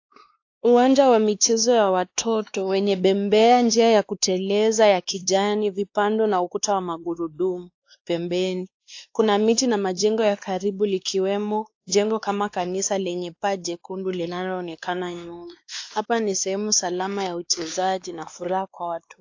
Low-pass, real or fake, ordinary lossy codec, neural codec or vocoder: 7.2 kHz; fake; AAC, 48 kbps; codec, 16 kHz, 2 kbps, X-Codec, WavLM features, trained on Multilingual LibriSpeech